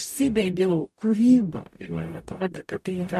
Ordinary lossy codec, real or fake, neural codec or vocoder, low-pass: MP3, 64 kbps; fake; codec, 44.1 kHz, 0.9 kbps, DAC; 14.4 kHz